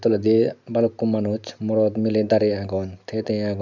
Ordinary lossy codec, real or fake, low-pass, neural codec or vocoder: none; real; 7.2 kHz; none